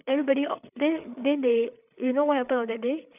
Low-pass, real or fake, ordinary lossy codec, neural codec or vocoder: 3.6 kHz; fake; none; codec, 16 kHz, 8 kbps, FreqCodec, larger model